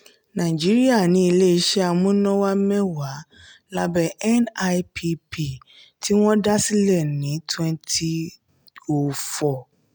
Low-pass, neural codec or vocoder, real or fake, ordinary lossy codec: none; none; real; none